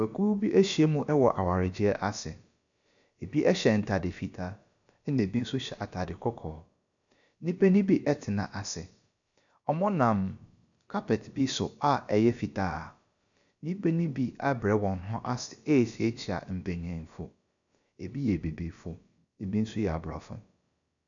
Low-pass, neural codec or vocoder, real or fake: 7.2 kHz; codec, 16 kHz, about 1 kbps, DyCAST, with the encoder's durations; fake